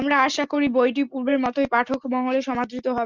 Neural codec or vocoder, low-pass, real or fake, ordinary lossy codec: none; 7.2 kHz; real; Opus, 32 kbps